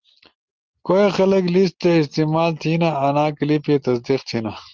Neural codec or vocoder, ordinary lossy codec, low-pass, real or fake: none; Opus, 16 kbps; 7.2 kHz; real